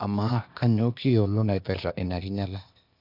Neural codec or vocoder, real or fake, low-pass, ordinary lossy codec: codec, 16 kHz, 0.8 kbps, ZipCodec; fake; 5.4 kHz; none